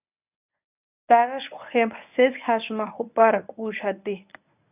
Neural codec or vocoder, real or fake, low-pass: codec, 24 kHz, 0.9 kbps, WavTokenizer, medium speech release version 1; fake; 3.6 kHz